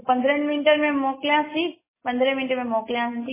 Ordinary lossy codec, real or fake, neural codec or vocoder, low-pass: MP3, 16 kbps; real; none; 3.6 kHz